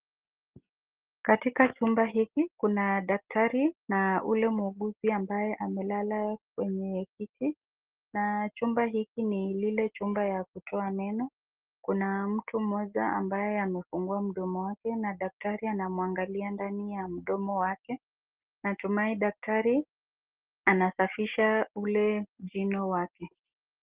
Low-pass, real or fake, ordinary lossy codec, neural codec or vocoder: 3.6 kHz; real; Opus, 16 kbps; none